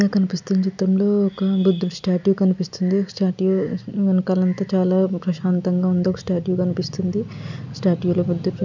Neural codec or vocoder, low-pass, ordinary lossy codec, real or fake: none; 7.2 kHz; none; real